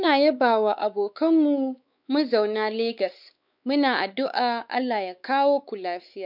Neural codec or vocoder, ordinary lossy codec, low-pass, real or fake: codec, 16 kHz, 4 kbps, X-Codec, WavLM features, trained on Multilingual LibriSpeech; none; 5.4 kHz; fake